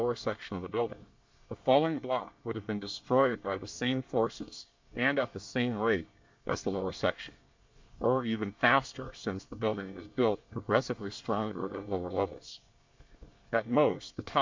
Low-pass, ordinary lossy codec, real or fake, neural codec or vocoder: 7.2 kHz; MP3, 64 kbps; fake; codec, 24 kHz, 1 kbps, SNAC